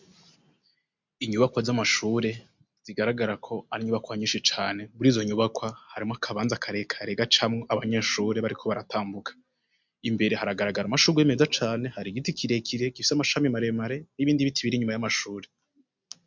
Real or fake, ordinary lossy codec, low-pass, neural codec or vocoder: real; MP3, 64 kbps; 7.2 kHz; none